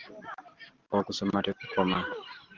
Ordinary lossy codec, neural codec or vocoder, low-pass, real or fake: Opus, 16 kbps; vocoder, 44.1 kHz, 128 mel bands every 512 samples, BigVGAN v2; 7.2 kHz; fake